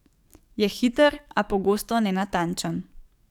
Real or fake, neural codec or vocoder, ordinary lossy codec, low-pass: fake; codec, 44.1 kHz, 7.8 kbps, DAC; none; 19.8 kHz